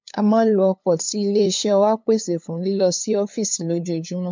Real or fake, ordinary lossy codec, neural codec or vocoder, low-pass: fake; MP3, 64 kbps; codec, 16 kHz, 2 kbps, FunCodec, trained on LibriTTS, 25 frames a second; 7.2 kHz